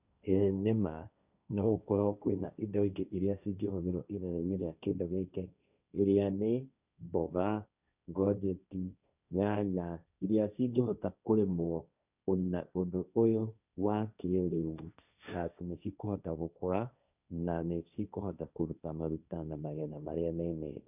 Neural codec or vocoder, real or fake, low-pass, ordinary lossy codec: codec, 16 kHz, 1.1 kbps, Voila-Tokenizer; fake; 3.6 kHz; none